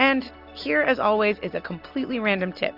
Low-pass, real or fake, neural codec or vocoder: 5.4 kHz; real; none